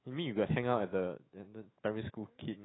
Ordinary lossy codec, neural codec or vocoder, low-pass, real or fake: AAC, 16 kbps; autoencoder, 48 kHz, 128 numbers a frame, DAC-VAE, trained on Japanese speech; 7.2 kHz; fake